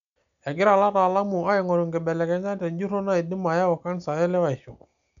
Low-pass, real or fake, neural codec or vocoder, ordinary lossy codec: 7.2 kHz; real; none; none